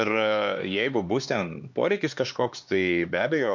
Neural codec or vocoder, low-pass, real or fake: codec, 16 kHz, 4 kbps, X-Codec, HuBERT features, trained on LibriSpeech; 7.2 kHz; fake